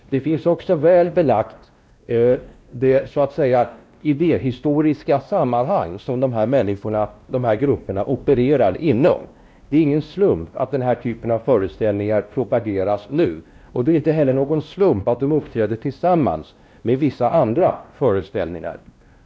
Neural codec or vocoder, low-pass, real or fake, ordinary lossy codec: codec, 16 kHz, 1 kbps, X-Codec, WavLM features, trained on Multilingual LibriSpeech; none; fake; none